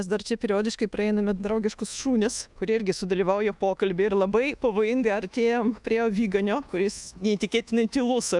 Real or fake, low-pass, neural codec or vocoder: fake; 10.8 kHz; codec, 24 kHz, 1.2 kbps, DualCodec